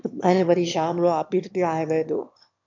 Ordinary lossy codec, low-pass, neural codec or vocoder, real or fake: MP3, 64 kbps; 7.2 kHz; autoencoder, 22.05 kHz, a latent of 192 numbers a frame, VITS, trained on one speaker; fake